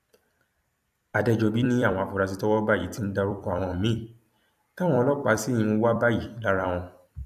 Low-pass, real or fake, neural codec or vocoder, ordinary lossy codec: 14.4 kHz; fake; vocoder, 44.1 kHz, 128 mel bands every 256 samples, BigVGAN v2; AAC, 96 kbps